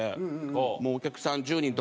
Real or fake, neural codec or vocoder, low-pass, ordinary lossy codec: real; none; none; none